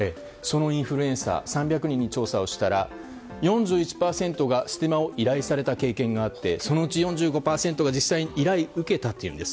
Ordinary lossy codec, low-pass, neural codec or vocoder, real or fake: none; none; none; real